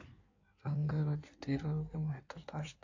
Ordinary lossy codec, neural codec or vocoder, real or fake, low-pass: AAC, 48 kbps; codec, 16 kHz in and 24 kHz out, 1.1 kbps, FireRedTTS-2 codec; fake; 7.2 kHz